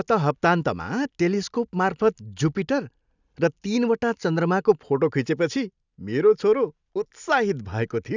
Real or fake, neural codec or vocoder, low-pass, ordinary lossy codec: real; none; 7.2 kHz; none